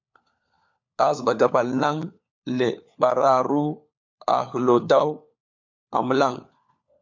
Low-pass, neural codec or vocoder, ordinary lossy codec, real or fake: 7.2 kHz; codec, 16 kHz, 4 kbps, FunCodec, trained on LibriTTS, 50 frames a second; MP3, 64 kbps; fake